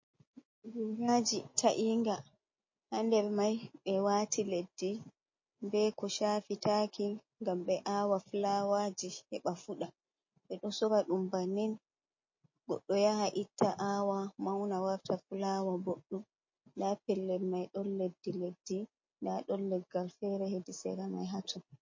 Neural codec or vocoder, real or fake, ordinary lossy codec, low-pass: none; real; MP3, 32 kbps; 7.2 kHz